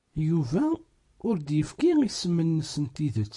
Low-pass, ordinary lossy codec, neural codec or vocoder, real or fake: 10.8 kHz; MP3, 48 kbps; none; real